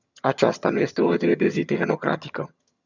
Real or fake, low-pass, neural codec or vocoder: fake; 7.2 kHz; vocoder, 22.05 kHz, 80 mel bands, HiFi-GAN